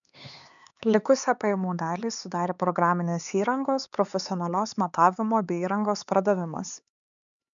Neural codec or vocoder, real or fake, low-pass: codec, 16 kHz, 4 kbps, X-Codec, HuBERT features, trained on LibriSpeech; fake; 7.2 kHz